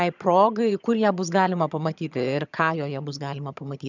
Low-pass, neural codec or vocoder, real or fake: 7.2 kHz; vocoder, 22.05 kHz, 80 mel bands, HiFi-GAN; fake